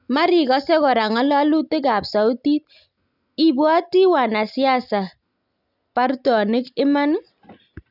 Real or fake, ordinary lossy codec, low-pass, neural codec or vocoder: real; none; 5.4 kHz; none